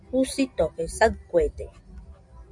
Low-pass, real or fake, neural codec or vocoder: 10.8 kHz; real; none